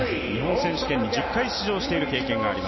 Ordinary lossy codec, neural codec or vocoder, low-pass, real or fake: MP3, 24 kbps; none; 7.2 kHz; real